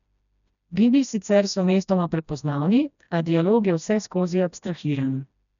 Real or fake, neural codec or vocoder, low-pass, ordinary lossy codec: fake; codec, 16 kHz, 1 kbps, FreqCodec, smaller model; 7.2 kHz; none